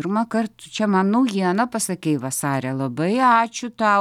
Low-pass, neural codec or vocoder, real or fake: 19.8 kHz; none; real